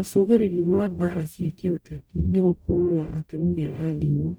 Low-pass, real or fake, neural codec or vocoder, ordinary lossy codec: none; fake; codec, 44.1 kHz, 0.9 kbps, DAC; none